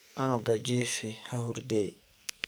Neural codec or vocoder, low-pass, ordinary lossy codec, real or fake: codec, 44.1 kHz, 2.6 kbps, SNAC; none; none; fake